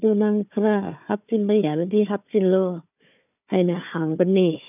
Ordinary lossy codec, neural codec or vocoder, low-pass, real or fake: none; codec, 16 kHz, 4 kbps, FunCodec, trained on Chinese and English, 50 frames a second; 3.6 kHz; fake